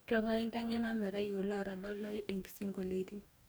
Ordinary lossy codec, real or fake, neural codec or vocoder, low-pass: none; fake; codec, 44.1 kHz, 2.6 kbps, DAC; none